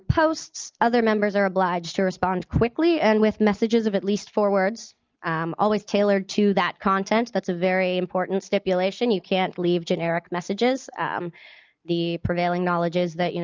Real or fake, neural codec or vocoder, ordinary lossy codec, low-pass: real; none; Opus, 24 kbps; 7.2 kHz